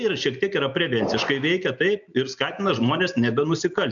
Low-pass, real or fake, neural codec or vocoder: 7.2 kHz; real; none